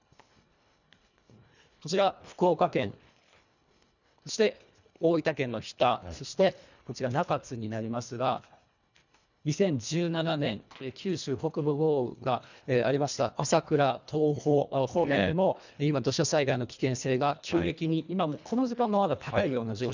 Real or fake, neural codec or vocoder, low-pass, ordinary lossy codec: fake; codec, 24 kHz, 1.5 kbps, HILCodec; 7.2 kHz; none